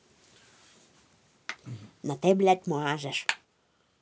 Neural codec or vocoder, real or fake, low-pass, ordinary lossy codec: none; real; none; none